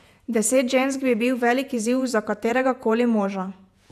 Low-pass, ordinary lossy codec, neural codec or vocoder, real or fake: 14.4 kHz; none; vocoder, 48 kHz, 128 mel bands, Vocos; fake